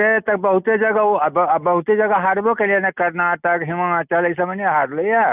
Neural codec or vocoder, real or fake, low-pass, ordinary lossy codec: none; real; 3.6 kHz; none